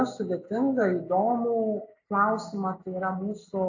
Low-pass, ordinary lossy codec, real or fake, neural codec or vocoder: 7.2 kHz; AAC, 48 kbps; real; none